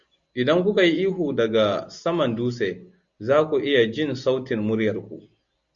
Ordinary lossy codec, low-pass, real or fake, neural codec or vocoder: Opus, 64 kbps; 7.2 kHz; real; none